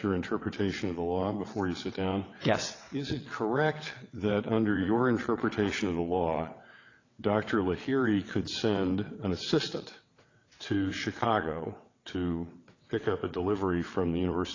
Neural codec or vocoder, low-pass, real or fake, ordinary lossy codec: vocoder, 22.05 kHz, 80 mel bands, WaveNeXt; 7.2 kHz; fake; AAC, 32 kbps